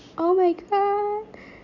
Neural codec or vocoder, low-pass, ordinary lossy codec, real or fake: none; 7.2 kHz; none; real